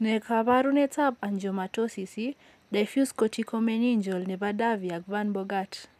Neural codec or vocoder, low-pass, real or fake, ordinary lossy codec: none; 14.4 kHz; real; none